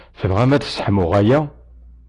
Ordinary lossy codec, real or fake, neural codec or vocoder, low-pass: AAC, 32 kbps; real; none; 10.8 kHz